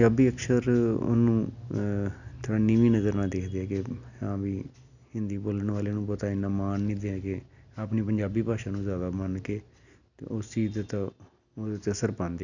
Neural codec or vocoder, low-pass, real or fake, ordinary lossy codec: none; 7.2 kHz; real; none